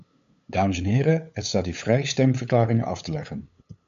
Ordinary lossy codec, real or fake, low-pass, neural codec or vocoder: MP3, 64 kbps; fake; 7.2 kHz; codec, 16 kHz, 8 kbps, FunCodec, trained on LibriTTS, 25 frames a second